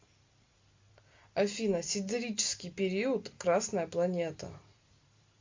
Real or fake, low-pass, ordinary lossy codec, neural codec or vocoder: real; 7.2 kHz; MP3, 48 kbps; none